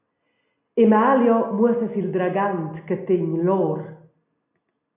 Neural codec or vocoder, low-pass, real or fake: none; 3.6 kHz; real